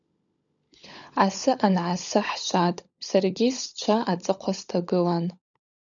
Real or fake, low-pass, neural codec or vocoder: fake; 7.2 kHz; codec, 16 kHz, 16 kbps, FunCodec, trained on LibriTTS, 50 frames a second